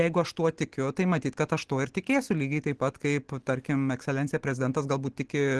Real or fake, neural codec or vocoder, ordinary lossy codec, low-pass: real; none; Opus, 16 kbps; 10.8 kHz